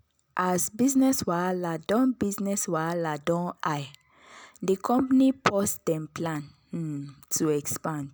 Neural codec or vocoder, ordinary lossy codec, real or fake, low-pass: none; none; real; none